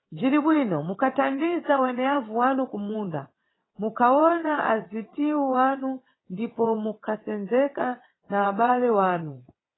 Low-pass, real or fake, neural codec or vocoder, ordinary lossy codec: 7.2 kHz; fake; vocoder, 22.05 kHz, 80 mel bands, WaveNeXt; AAC, 16 kbps